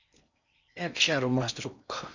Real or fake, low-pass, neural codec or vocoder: fake; 7.2 kHz; codec, 16 kHz in and 24 kHz out, 0.8 kbps, FocalCodec, streaming, 65536 codes